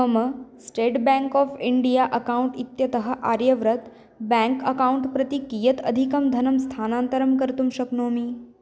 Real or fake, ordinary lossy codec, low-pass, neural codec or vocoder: real; none; none; none